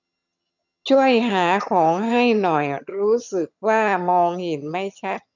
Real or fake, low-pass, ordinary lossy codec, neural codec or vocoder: fake; 7.2 kHz; none; vocoder, 22.05 kHz, 80 mel bands, HiFi-GAN